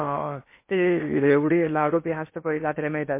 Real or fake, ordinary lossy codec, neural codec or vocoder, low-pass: fake; MP3, 24 kbps; codec, 16 kHz in and 24 kHz out, 0.8 kbps, FocalCodec, streaming, 65536 codes; 3.6 kHz